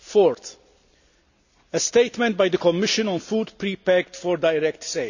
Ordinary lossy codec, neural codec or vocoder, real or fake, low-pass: none; none; real; 7.2 kHz